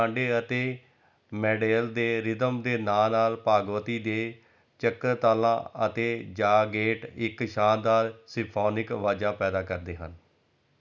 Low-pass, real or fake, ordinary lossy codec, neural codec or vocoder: 7.2 kHz; real; none; none